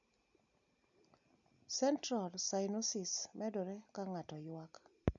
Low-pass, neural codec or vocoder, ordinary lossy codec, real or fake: 7.2 kHz; none; none; real